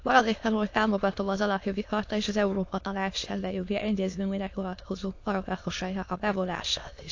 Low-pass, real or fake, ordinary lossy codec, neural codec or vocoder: 7.2 kHz; fake; AAC, 48 kbps; autoencoder, 22.05 kHz, a latent of 192 numbers a frame, VITS, trained on many speakers